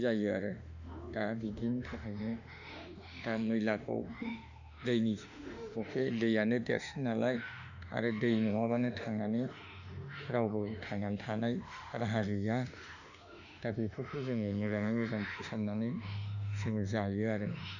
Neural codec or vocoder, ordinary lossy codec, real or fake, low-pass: autoencoder, 48 kHz, 32 numbers a frame, DAC-VAE, trained on Japanese speech; none; fake; 7.2 kHz